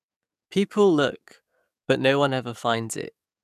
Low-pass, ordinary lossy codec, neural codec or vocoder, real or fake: 14.4 kHz; none; codec, 44.1 kHz, 7.8 kbps, DAC; fake